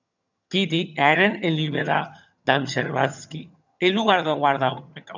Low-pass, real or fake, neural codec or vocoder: 7.2 kHz; fake; vocoder, 22.05 kHz, 80 mel bands, HiFi-GAN